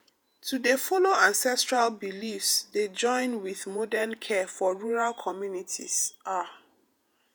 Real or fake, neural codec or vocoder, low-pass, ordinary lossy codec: fake; vocoder, 48 kHz, 128 mel bands, Vocos; none; none